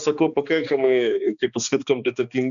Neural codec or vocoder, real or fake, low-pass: codec, 16 kHz, 2 kbps, X-Codec, HuBERT features, trained on balanced general audio; fake; 7.2 kHz